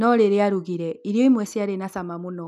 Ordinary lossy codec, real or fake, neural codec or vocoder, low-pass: none; real; none; 14.4 kHz